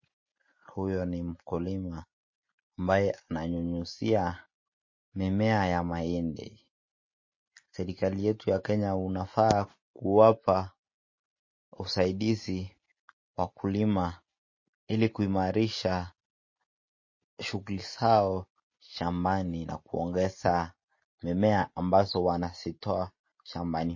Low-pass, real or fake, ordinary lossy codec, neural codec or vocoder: 7.2 kHz; real; MP3, 32 kbps; none